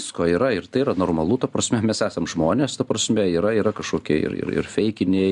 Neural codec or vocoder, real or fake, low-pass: none; real; 10.8 kHz